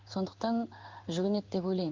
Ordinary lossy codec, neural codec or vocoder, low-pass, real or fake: Opus, 32 kbps; codec, 16 kHz in and 24 kHz out, 1 kbps, XY-Tokenizer; 7.2 kHz; fake